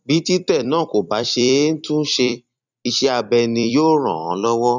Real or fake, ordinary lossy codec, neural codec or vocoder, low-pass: fake; none; vocoder, 44.1 kHz, 128 mel bands every 256 samples, BigVGAN v2; 7.2 kHz